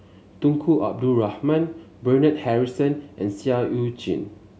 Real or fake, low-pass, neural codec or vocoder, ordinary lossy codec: real; none; none; none